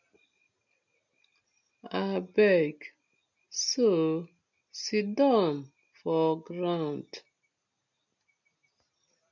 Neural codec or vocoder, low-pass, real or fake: none; 7.2 kHz; real